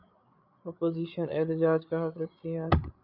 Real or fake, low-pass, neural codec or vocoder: fake; 5.4 kHz; codec, 16 kHz, 8 kbps, FreqCodec, larger model